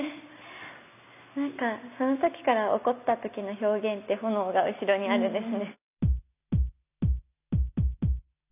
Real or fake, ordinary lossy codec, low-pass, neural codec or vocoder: real; none; 3.6 kHz; none